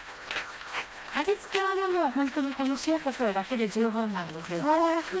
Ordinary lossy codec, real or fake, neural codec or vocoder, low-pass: none; fake; codec, 16 kHz, 1 kbps, FreqCodec, smaller model; none